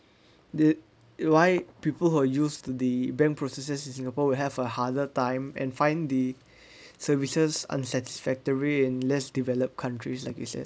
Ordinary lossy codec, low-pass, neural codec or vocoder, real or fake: none; none; none; real